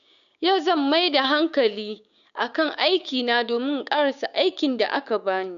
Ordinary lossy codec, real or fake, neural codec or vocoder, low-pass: none; fake; codec, 16 kHz, 6 kbps, DAC; 7.2 kHz